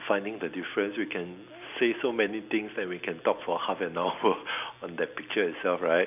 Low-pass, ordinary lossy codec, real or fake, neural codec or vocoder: 3.6 kHz; none; real; none